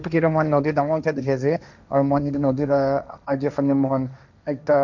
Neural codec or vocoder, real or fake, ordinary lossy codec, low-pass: codec, 16 kHz, 1.1 kbps, Voila-Tokenizer; fake; none; 7.2 kHz